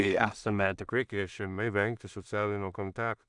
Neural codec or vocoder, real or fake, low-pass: codec, 16 kHz in and 24 kHz out, 0.4 kbps, LongCat-Audio-Codec, two codebook decoder; fake; 10.8 kHz